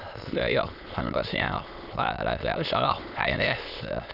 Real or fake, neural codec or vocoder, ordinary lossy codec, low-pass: fake; autoencoder, 22.05 kHz, a latent of 192 numbers a frame, VITS, trained on many speakers; none; 5.4 kHz